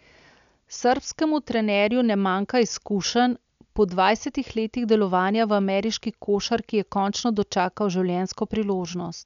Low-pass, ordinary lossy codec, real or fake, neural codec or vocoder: 7.2 kHz; none; real; none